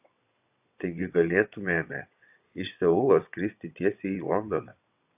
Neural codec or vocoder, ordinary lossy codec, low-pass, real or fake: vocoder, 22.05 kHz, 80 mel bands, WaveNeXt; AAC, 32 kbps; 3.6 kHz; fake